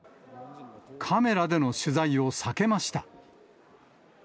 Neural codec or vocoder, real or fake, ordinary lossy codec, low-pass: none; real; none; none